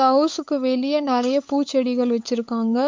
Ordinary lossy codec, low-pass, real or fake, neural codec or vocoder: MP3, 48 kbps; 7.2 kHz; fake; codec, 16 kHz, 8 kbps, FunCodec, trained on LibriTTS, 25 frames a second